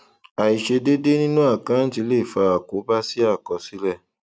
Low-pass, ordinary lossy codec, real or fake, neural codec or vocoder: none; none; real; none